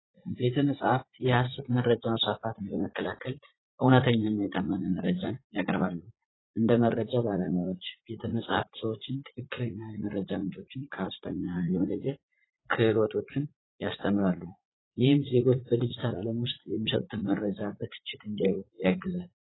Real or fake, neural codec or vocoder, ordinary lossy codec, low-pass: fake; vocoder, 44.1 kHz, 80 mel bands, Vocos; AAC, 16 kbps; 7.2 kHz